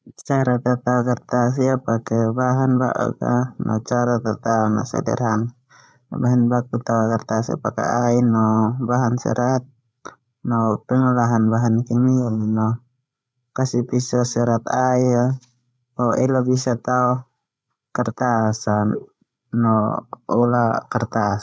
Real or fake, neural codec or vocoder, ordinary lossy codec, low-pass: fake; codec, 16 kHz, 16 kbps, FreqCodec, larger model; none; none